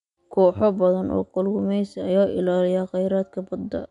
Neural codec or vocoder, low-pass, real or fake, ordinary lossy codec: none; 10.8 kHz; real; none